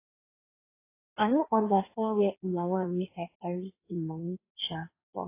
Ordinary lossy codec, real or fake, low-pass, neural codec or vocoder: AAC, 24 kbps; fake; 3.6 kHz; codec, 16 kHz in and 24 kHz out, 1.1 kbps, FireRedTTS-2 codec